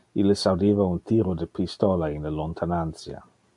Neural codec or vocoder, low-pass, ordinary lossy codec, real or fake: none; 10.8 kHz; AAC, 64 kbps; real